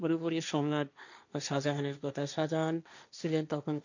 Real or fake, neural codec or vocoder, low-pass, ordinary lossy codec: fake; codec, 16 kHz, 1.1 kbps, Voila-Tokenizer; 7.2 kHz; none